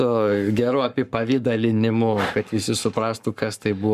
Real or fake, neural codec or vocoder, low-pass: fake; codec, 44.1 kHz, 7.8 kbps, Pupu-Codec; 14.4 kHz